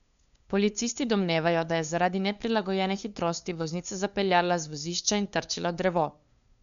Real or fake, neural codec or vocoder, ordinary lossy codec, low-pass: fake; codec, 16 kHz, 2 kbps, FunCodec, trained on LibriTTS, 25 frames a second; none; 7.2 kHz